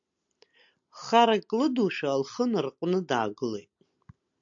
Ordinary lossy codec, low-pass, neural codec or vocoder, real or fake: AAC, 64 kbps; 7.2 kHz; none; real